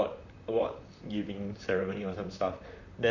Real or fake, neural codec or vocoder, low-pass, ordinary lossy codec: fake; vocoder, 44.1 kHz, 128 mel bands, Pupu-Vocoder; 7.2 kHz; none